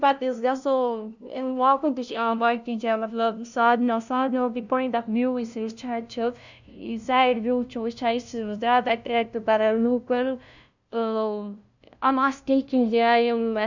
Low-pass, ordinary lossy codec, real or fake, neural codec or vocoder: 7.2 kHz; none; fake; codec, 16 kHz, 0.5 kbps, FunCodec, trained on LibriTTS, 25 frames a second